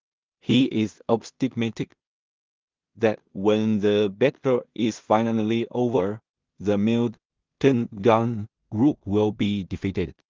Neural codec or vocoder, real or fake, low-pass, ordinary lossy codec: codec, 16 kHz in and 24 kHz out, 0.4 kbps, LongCat-Audio-Codec, two codebook decoder; fake; 7.2 kHz; Opus, 32 kbps